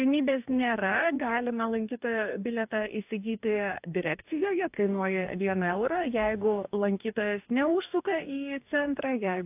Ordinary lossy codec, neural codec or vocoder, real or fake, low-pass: AAC, 32 kbps; codec, 44.1 kHz, 2.6 kbps, DAC; fake; 3.6 kHz